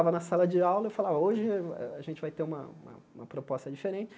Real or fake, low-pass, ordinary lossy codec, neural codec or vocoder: real; none; none; none